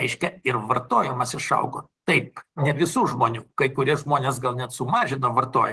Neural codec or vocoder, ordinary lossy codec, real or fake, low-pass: none; Opus, 16 kbps; real; 10.8 kHz